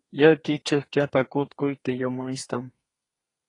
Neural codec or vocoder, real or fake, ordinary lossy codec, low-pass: codec, 32 kHz, 1.9 kbps, SNAC; fake; AAC, 32 kbps; 10.8 kHz